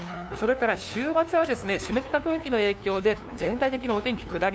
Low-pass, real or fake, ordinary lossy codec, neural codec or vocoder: none; fake; none; codec, 16 kHz, 2 kbps, FunCodec, trained on LibriTTS, 25 frames a second